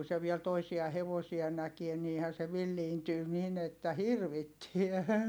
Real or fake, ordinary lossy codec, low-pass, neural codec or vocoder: real; none; none; none